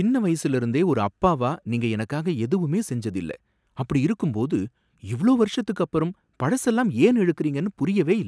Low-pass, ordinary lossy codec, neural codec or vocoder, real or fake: none; none; none; real